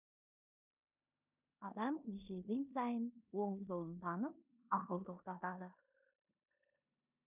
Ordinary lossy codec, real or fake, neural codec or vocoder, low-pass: none; fake; codec, 16 kHz in and 24 kHz out, 0.9 kbps, LongCat-Audio-Codec, four codebook decoder; 3.6 kHz